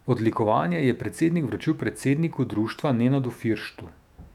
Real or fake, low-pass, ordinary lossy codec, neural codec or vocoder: fake; 19.8 kHz; none; autoencoder, 48 kHz, 128 numbers a frame, DAC-VAE, trained on Japanese speech